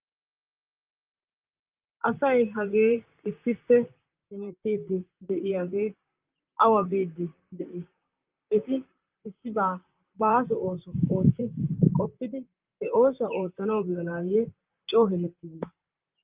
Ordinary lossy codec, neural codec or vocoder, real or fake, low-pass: Opus, 24 kbps; vocoder, 44.1 kHz, 128 mel bands, Pupu-Vocoder; fake; 3.6 kHz